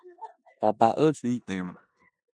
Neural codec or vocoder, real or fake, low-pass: codec, 16 kHz in and 24 kHz out, 0.9 kbps, LongCat-Audio-Codec, four codebook decoder; fake; 9.9 kHz